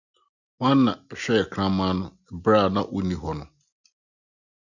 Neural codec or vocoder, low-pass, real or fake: none; 7.2 kHz; real